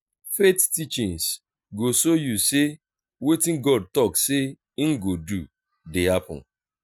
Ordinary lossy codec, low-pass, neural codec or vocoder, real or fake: none; none; none; real